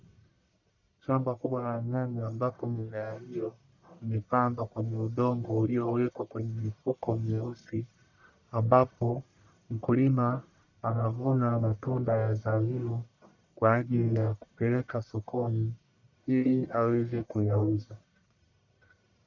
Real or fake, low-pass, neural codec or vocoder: fake; 7.2 kHz; codec, 44.1 kHz, 1.7 kbps, Pupu-Codec